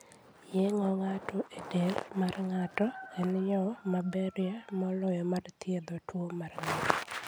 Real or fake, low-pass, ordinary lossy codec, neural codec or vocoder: real; none; none; none